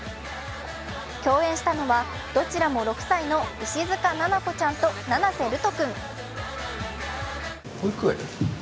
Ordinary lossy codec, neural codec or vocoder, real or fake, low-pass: none; none; real; none